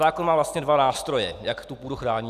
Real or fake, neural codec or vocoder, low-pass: real; none; 14.4 kHz